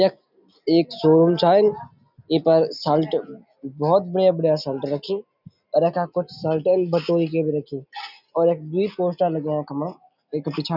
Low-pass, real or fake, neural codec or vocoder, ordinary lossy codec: 5.4 kHz; real; none; none